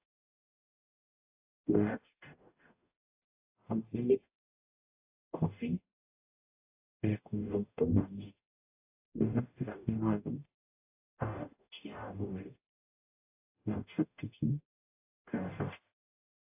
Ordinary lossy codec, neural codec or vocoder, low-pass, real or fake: AAC, 24 kbps; codec, 44.1 kHz, 0.9 kbps, DAC; 3.6 kHz; fake